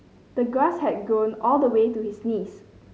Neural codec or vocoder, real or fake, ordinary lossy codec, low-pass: none; real; none; none